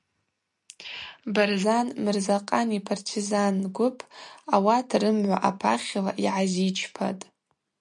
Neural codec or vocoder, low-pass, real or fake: none; 10.8 kHz; real